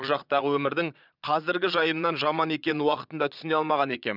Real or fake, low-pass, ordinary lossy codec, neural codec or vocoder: fake; 5.4 kHz; none; vocoder, 22.05 kHz, 80 mel bands, Vocos